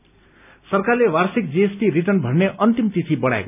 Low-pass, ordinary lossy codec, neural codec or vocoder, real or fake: 3.6 kHz; none; none; real